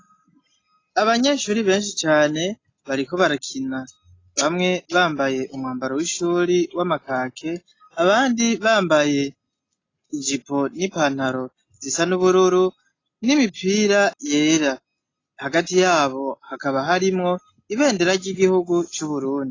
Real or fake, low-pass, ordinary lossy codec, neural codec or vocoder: real; 7.2 kHz; AAC, 32 kbps; none